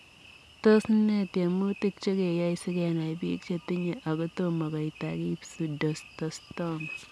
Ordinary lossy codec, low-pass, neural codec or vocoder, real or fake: none; none; none; real